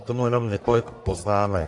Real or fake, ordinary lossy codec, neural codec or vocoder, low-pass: fake; AAC, 64 kbps; codec, 44.1 kHz, 1.7 kbps, Pupu-Codec; 10.8 kHz